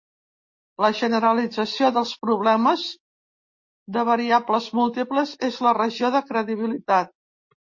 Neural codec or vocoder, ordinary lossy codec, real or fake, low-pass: none; MP3, 32 kbps; real; 7.2 kHz